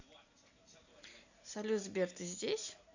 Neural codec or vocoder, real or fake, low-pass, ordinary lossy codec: none; real; 7.2 kHz; none